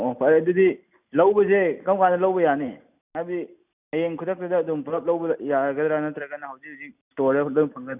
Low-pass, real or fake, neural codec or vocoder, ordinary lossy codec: 3.6 kHz; real; none; none